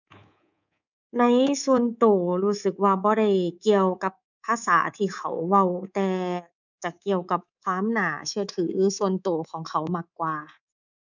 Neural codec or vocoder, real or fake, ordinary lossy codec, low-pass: codec, 24 kHz, 3.1 kbps, DualCodec; fake; none; 7.2 kHz